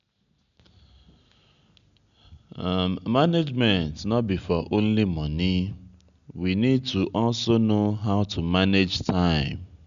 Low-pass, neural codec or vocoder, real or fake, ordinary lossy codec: 7.2 kHz; none; real; none